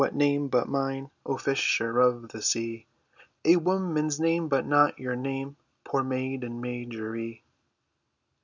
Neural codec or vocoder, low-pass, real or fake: none; 7.2 kHz; real